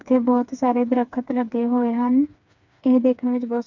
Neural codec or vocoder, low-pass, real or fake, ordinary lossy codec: codec, 16 kHz, 4 kbps, FreqCodec, smaller model; 7.2 kHz; fake; MP3, 64 kbps